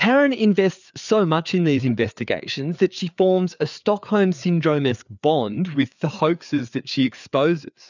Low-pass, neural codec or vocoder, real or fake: 7.2 kHz; codec, 16 kHz, 4 kbps, FreqCodec, larger model; fake